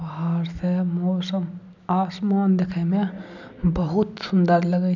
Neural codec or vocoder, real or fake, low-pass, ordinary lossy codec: none; real; 7.2 kHz; none